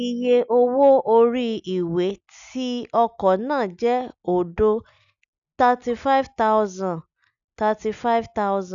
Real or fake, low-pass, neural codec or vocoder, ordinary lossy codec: real; 7.2 kHz; none; none